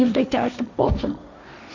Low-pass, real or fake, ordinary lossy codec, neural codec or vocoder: 7.2 kHz; fake; none; codec, 16 kHz, 1.1 kbps, Voila-Tokenizer